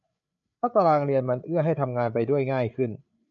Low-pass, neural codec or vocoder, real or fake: 7.2 kHz; codec, 16 kHz, 8 kbps, FreqCodec, larger model; fake